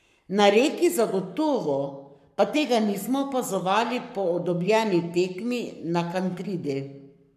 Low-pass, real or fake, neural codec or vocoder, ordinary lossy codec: 14.4 kHz; fake; codec, 44.1 kHz, 7.8 kbps, Pupu-Codec; none